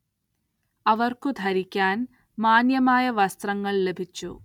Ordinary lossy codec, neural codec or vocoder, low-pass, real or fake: none; none; 19.8 kHz; real